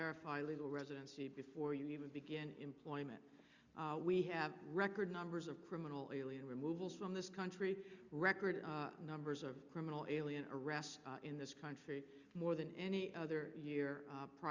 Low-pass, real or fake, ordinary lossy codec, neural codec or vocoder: 7.2 kHz; real; Opus, 64 kbps; none